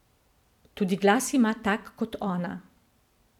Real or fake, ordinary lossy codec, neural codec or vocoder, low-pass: fake; none; vocoder, 44.1 kHz, 128 mel bands every 256 samples, BigVGAN v2; 19.8 kHz